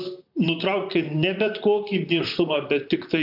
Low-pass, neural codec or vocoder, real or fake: 5.4 kHz; vocoder, 44.1 kHz, 128 mel bands, Pupu-Vocoder; fake